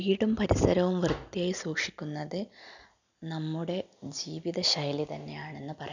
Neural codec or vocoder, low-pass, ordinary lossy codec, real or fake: none; 7.2 kHz; none; real